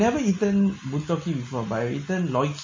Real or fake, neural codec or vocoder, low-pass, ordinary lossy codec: real; none; 7.2 kHz; MP3, 32 kbps